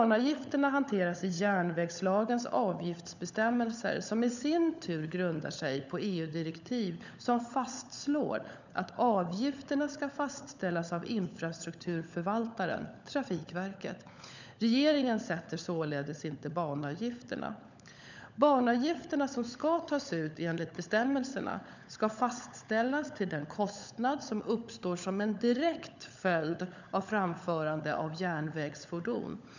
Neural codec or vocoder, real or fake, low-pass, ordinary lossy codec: codec, 16 kHz, 16 kbps, FunCodec, trained on LibriTTS, 50 frames a second; fake; 7.2 kHz; none